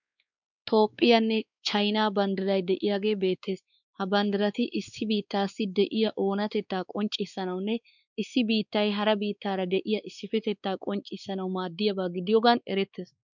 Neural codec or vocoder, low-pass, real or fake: codec, 16 kHz, 4 kbps, X-Codec, WavLM features, trained on Multilingual LibriSpeech; 7.2 kHz; fake